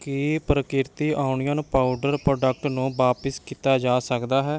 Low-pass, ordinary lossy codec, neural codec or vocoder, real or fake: none; none; none; real